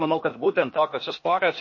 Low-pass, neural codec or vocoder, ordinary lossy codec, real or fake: 7.2 kHz; codec, 16 kHz, 0.8 kbps, ZipCodec; MP3, 32 kbps; fake